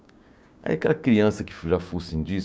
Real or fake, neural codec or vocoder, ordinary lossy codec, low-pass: fake; codec, 16 kHz, 6 kbps, DAC; none; none